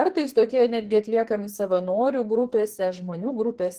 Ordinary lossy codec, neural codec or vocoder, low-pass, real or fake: Opus, 16 kbps; codec, 44.1 kHz, 2.6 kbps, SNAC; 14.4 kHz; fake